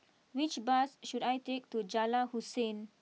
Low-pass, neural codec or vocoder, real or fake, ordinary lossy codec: none; none; real; none